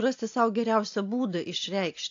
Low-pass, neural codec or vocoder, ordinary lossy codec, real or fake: 7.2 kHz; none; AAC, 48 kbps; real